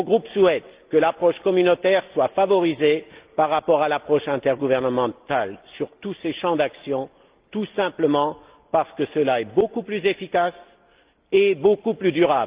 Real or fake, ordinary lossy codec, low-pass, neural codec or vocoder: real; Opus, 32 kbps; 3.6 kHz; none